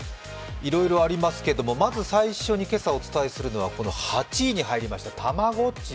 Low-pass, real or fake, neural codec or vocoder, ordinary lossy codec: none; real; none; none